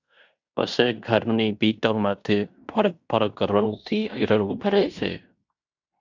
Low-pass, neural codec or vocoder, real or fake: 7.2 kHz; codec, 16 kHz in and 24 kHz out, 0.9 kbps, LongCat-Audio-Codec, fine tuned four codebook decoder; fake